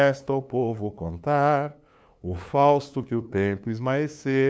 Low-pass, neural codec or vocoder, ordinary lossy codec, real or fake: none; codec, 16 kHz, 2 kbps, FunCodec, trained on LibriTTS, 25 frames a second; none; fake